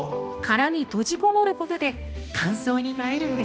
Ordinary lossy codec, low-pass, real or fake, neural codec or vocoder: none; none; fake; codec, 16 kHz, 1 kbps, X-Codec, HuBERT features, trained on balanced general audio